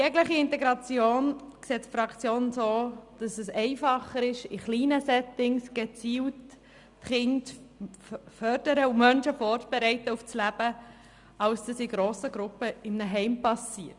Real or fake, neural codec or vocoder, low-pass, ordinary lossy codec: real; none; 10.8 kHz; Opus, 64 kbps